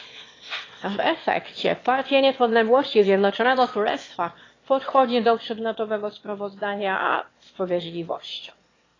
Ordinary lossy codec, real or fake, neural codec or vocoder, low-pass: AAC, 32 kbps; fake; autoencoder, 22.05 kHz, a latent of 192 numbers a frame, VITS, trained on one speaker; 7.2 kHz